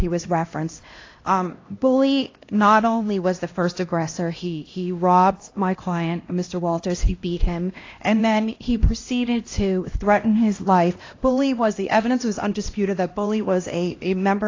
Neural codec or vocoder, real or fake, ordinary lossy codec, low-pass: codec, 16 kHz, 1 kbps, X-Codec, HuBERT features, trained on LibriSpeech; fake; AAC, 32 kbps; 7.2 kHz